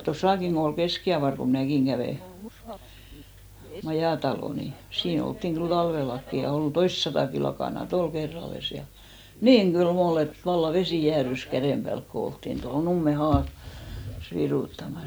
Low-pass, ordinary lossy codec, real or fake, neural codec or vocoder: none; none; real; none